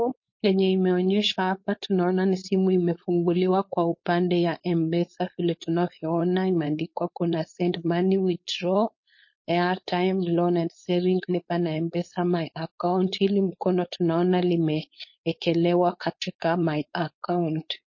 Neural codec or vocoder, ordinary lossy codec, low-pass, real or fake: codec, 16 kHz, 4.8 kbps, FACodec; MP3, 32 kbps; 7.2 kHz; fake